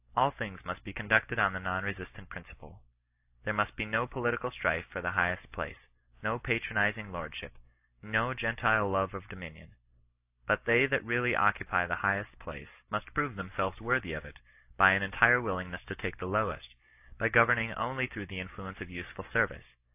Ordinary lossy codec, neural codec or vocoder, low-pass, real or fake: AAC, 32 kbps; none; 3.6 kHz; real